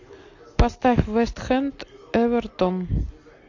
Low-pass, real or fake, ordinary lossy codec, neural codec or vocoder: 7.2 kHz; real; AAC, 48 kbps; none